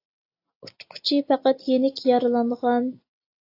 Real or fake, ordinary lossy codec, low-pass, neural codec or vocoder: real; AAC, 32 kbps; 5.4 kHz; none